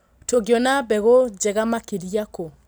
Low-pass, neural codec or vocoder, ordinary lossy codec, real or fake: none; none; none; real